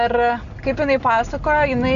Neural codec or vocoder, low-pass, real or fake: none; 7.2 kHz; real